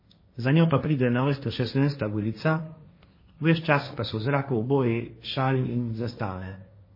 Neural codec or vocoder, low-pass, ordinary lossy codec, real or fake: codec, 16 kHz, 1.1 kbps, Voila-Tokenizer; 5.4 kHz; MP3, 24 kbps; fake